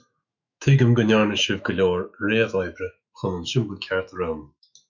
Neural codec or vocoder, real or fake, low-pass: autoencoder, 48 kHz, 128 numbers a frame, DAC-VAE, trained on Japanese speech; fake; 7.2 kHz